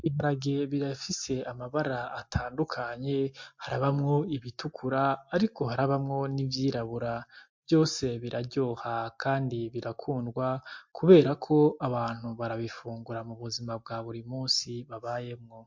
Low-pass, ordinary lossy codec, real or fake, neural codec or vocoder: 7.2 kHz; MP3, 48 kbps; real; none